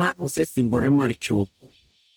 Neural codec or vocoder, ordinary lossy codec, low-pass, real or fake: codec, 44.1 kHz, 0.9 kbps, DAC; none; none; fake